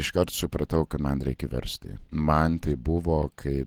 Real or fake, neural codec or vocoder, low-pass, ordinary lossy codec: real; none; 19.8 kHz; Opus, 16 kbps